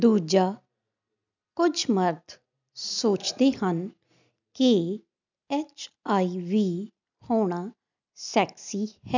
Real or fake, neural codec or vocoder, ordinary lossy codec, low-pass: real; none; none; 7.2 kHz